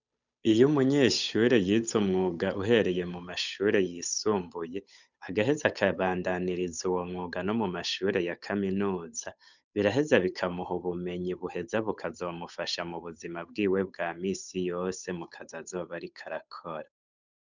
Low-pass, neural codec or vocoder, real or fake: 7.2 kHz; codec, 16 kHz, 8 kbps, FunCodec, trained on Chinese and English, 25 frames a second; fake